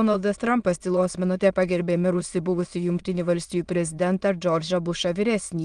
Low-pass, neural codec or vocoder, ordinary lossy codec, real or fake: 9.9 kHz; autoencoder, 22.05 kHz, a latent of 192 numbers a frame, VITS, trained on many speakers; Opus, 24 kbps; fake